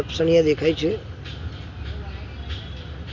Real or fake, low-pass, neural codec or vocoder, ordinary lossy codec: real; 7.2 kHz; none; none